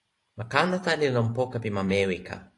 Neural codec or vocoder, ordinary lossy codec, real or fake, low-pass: vocoder, 44.1 kHz, 128 mel bands every 512 samples, BigVGAN v2; AAC, 48 kbps; fake; 10.8 kHz